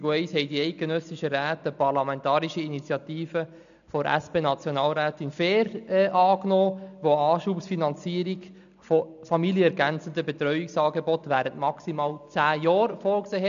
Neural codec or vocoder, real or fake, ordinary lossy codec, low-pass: none; real; none; 7.2 kHz